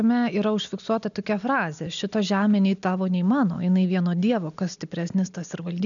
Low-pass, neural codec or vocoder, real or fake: 7.2 kHz; none; real